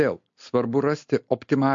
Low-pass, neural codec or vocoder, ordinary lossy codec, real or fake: 7.2 kHz; none; MP3, 48 kbps; real